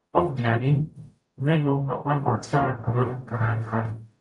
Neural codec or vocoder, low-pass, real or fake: codec, 44.1 kHz, 0.9 kbps, DAC; 10.8 kHz; fake